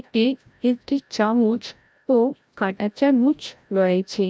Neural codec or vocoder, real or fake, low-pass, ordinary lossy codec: codec, 16 kHz, 0.5 kbps, FreqCodec, larger model; fake; none; none